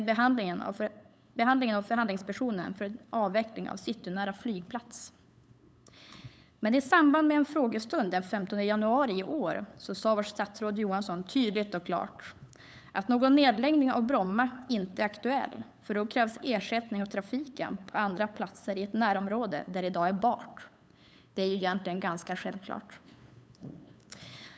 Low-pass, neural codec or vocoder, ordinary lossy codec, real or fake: none; codec, 16 kHz, 8 kbps, FunCodec, trained on LibriTTS, 25 frames a second; none; fake